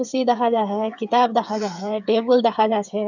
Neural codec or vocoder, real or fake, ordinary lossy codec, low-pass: codec, 16 kHz, 8 kbps, FreqCodec, smaller model; fake; none; 7.2 kHz